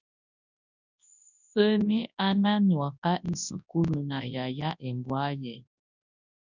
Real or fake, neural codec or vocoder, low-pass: fake; codec, 24 kHz, 0.9 kbps, WavTokenizer, large speech release; 7.2 kHz